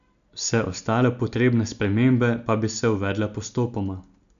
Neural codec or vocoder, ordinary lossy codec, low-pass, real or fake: none; none; 7.2 kHz; real